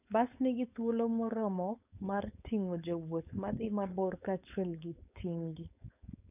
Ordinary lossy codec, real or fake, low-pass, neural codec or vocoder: none; fake; 3.6 kHz; codec, 16 kHz, 4.8 kbps, FACodec